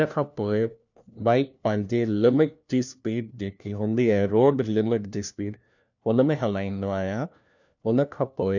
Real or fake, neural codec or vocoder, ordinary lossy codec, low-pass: fake; codec, 16 kHz, 1 kbps, FunCodec, trained on LibriTTS, 50 frames a second; none; 7.2 kHz